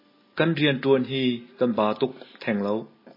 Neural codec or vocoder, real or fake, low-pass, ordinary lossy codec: none; real; 5.4 kHz; MP3, 24 kbps